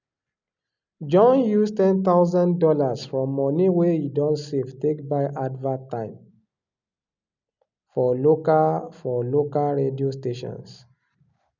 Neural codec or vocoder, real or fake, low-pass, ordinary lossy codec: none; real; 7.2 kHz; none